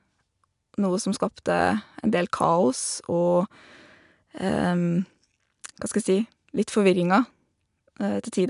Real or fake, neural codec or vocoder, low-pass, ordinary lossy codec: real; none; 10.8 kHz; none